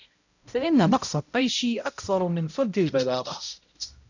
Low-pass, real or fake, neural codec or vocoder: 7.2 kHz; fake; codec, 16 kHz, 0.5 kbps, X-Codec, HuBERT features, trained on balanced general audio